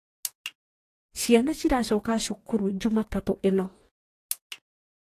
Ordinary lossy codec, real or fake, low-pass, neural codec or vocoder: AAC, 48 kbps; fake; 14.4 kHz; codec, 44.1 kHz, 2.6 kbps, DAC